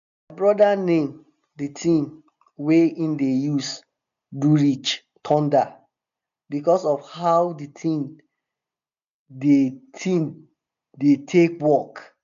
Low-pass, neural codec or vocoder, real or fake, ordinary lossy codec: 7.2 kHz; none; real; none